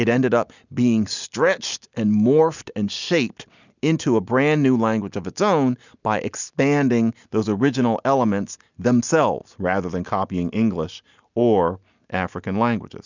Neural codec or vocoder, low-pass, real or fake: none; 7.2 kHz; real